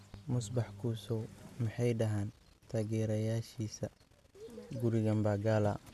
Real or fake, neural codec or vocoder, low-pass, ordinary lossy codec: real; none; 14.4 kHz; none